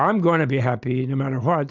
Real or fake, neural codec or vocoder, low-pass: real; none; 7.2 kHz